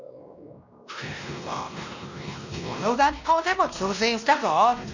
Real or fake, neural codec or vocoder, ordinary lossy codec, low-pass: fake; codec, 16 kHz, 1 kbps, X-Codec, WavLM features, trained on Multilingual LibriSpeech; none; 7.2 kHz